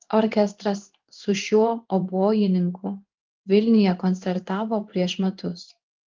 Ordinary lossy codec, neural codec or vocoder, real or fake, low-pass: Opus, 32 kbps; codec, 16 kHz in and 24 kHz out, 1 kbps, XY-Tokenizer; fake; 7.2 kHz